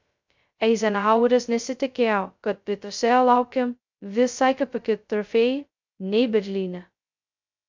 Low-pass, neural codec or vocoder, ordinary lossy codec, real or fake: 7.2 kHz; codec, 16 kHz, 0.2 kbps, FocalCodec; MP3, 64 kbps; fake